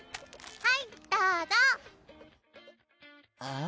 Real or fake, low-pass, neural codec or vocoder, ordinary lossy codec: real; none; none; none